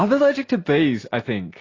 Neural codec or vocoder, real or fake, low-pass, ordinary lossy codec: none; real; 7.2 kHz; AAC, 32 kbps